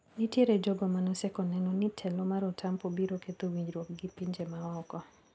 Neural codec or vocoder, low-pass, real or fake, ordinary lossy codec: none; none; real; none